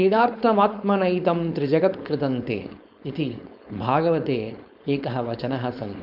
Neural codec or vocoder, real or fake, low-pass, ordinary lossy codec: codec, 16 kHz, 4.8 kbps, FACodec; fake; 5.4 kHz; none